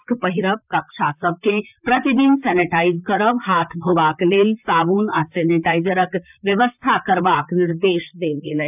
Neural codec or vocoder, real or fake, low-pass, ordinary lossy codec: vocoder, 44.1 kHz, 128 mel bands, Pupu-Vocoder; fake; 3.6 kHz; none